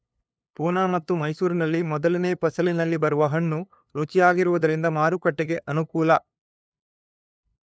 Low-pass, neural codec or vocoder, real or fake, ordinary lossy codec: none; codec, 16 kHz, 2 kbps, FunCodec, trained on LibriTTS, 25 frames a second; fake; none